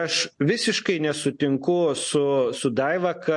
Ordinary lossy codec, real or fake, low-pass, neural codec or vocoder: MP3, 48 kbps; real; 10.8 kHz; none